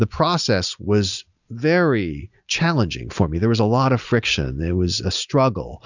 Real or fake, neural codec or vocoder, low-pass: real; none; 7.2 kHz